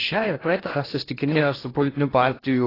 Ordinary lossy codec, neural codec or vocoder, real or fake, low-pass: AAC, 24 kbps; codec, 16 kHz in and 24 kHz out, 0.8 kbps, FocalCodec, streaming, 65536 codes; fake; 5.4 kHz